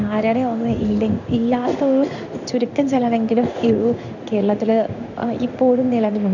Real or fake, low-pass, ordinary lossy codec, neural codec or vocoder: fake; 7.2 kHz; none; codec, 16 kHz in and 24 kHz out, 1 kbps, XY-Tokenizer